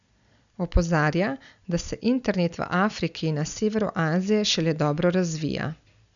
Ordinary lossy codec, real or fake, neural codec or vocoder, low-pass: none; real; none; 7.2 kHz